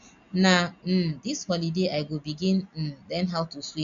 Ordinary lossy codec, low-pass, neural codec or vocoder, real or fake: AAC, 64 kbps; 7.2 kHz; none; real